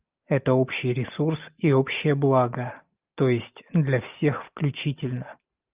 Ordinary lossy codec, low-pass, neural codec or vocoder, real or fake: Opus, 24 kbps; 3.6 kHz; none; real